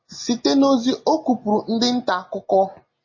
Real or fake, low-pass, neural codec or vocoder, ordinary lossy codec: real; 7.2 kHz; none; MP3, 32 kbps